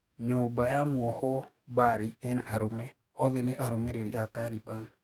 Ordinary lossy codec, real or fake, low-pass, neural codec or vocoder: none; fake; 19.8 kHz; codec, 44.1 kHz, 2.6 kbps, DAC